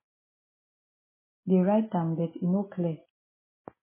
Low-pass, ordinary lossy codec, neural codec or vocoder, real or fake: 3.6 kHz; MP3, 16 kbps; none; real